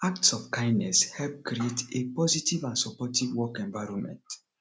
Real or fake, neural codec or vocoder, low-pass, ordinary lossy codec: real; none; none; none